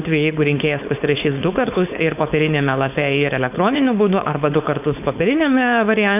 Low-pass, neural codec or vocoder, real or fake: 3.6 kHz; codec, 16 kHz, 4.8 kbps, FACodec; fake